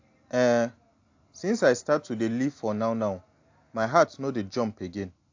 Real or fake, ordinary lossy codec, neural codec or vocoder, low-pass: real; AAC, 48 kbps; none; 7.2 kHz